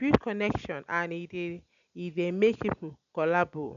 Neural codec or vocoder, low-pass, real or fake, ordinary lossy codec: none; 7.2 kHz; real; none